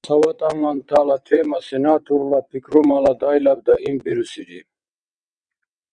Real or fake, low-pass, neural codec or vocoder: fake; 9.9 kHz; vocoder, 22.05 kHz, 80 mel bands, WaveNeXt